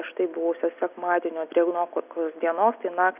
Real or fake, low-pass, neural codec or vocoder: real; 3.6 kHz; none